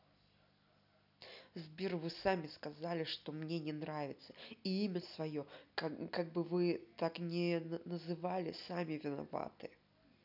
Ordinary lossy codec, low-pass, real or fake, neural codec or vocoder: none; 5.4 kHz; real; none